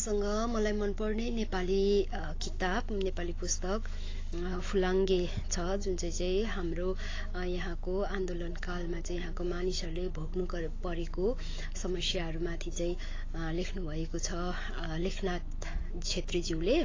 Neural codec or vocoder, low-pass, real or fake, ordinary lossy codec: autoencoder, 48 kHz, 128 numbers a frame, DAC-VAE, trained on Japanese speech; 7.2 kHz; fake; AAC, 32 kbps